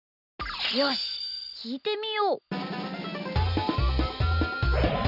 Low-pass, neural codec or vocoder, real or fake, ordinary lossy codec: 5.4 kHz; none; real; none